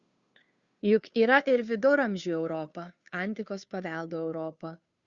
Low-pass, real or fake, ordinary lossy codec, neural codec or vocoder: 7.2 kHz; fake; Opus, 64 kbps; codec, 16 kHz, 2 kbps, FunCodec, trained on Chinese and English, 25 frames a second